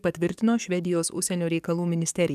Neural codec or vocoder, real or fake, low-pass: codec, 44.1 kHz, 7.8 kbps, DAC; fake; 14.4 kHz